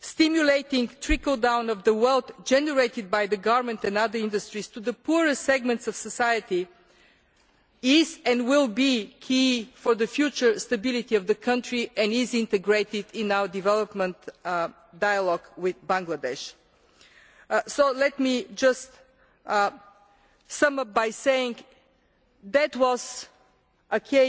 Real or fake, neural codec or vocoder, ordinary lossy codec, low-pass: real; none; none; none